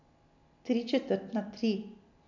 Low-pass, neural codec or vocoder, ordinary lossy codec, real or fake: 7.2 kHz; none; none; real